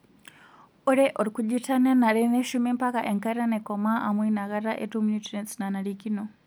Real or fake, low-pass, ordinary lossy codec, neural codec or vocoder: real; none; none; none